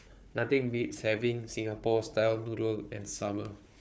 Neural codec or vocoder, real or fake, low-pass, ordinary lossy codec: codec, 16 kHz, 4 kbps, FunCodec, trained on Chinese and English, 50 frames a second; fake; none; none